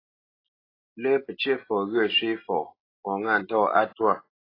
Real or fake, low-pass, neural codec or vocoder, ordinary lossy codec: real; 5.4 kHz; none; AAC, 24 kbps